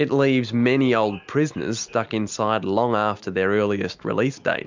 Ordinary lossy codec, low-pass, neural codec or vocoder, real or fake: MP3, 64 kbps; 7.2 kHz; none; real